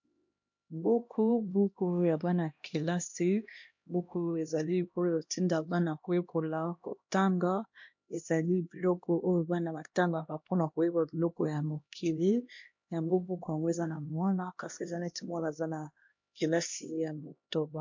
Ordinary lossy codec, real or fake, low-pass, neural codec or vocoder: MP3, 48 kbps; fake; 7.2 kHz; codec, 16 kHz, 1 kbps, X-Codec, HuBERT features, trained on LibriSpeech